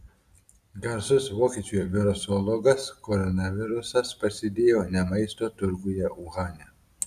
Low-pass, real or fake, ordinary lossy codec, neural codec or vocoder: 14.4 kHz; real; Opus, 64 kbps; none